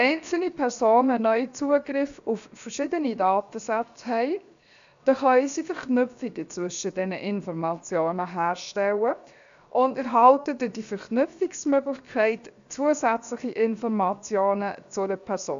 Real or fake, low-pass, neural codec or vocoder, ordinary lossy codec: fake; 7.2 kHz; codec, 16 kHz, 0.7 kbps, FocalCodec; none